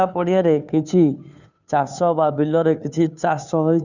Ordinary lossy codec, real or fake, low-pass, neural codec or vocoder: none; fake; 7.2 kHz; codec, 16 kHz, 2 kbps, FunCodec, trained on Chinese and English, 25 frames a second